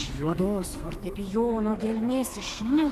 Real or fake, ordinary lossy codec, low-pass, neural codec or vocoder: fake; Opus, 64 kbps; 14.4 kHz; codec, 32 kHz, 1.9 kbps, SNAC